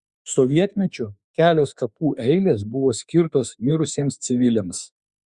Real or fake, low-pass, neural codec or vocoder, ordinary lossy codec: fake; 10.8 kHz; autoencoder, 48 kHz, 32 numbers a frame, DAC-VAE, trained on Japanese speech; Opus, 64 kbps